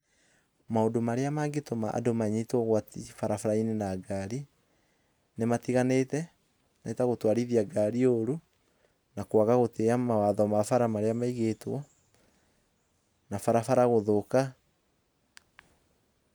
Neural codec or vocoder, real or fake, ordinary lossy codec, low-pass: none; real; none; none